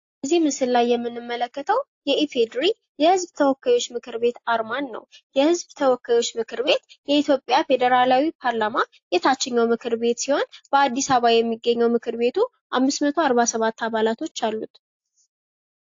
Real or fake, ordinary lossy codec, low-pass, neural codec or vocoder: real; AAC, 48 kbps; 7.2 kHz; none